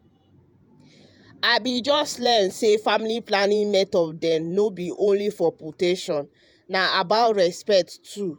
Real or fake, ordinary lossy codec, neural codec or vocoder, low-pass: fake; none; vocoder, 48 kHz, 128 mel bands, Vocos; none